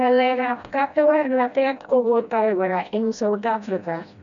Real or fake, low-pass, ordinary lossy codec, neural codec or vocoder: fake; 7.2 kHz; none; codec, 16 kHz, 1 kbps, FreqCodec, smaller model